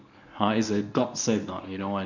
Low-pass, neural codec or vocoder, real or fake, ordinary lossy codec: 7.2 kHz; codec, 24 kHz, 0.9 kbps, WavTokenizer, medium speech release version 1; fake; none